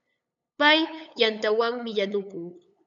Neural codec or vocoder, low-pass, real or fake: codec, 16 kHz, 8 kbps, FunCodec, trained on LibriTTS, 25 frames a second; 7.2 kHz; fake